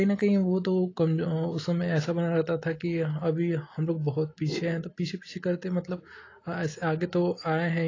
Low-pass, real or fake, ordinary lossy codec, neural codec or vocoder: 7.2 kHz; real; AAC, 32 kbps; none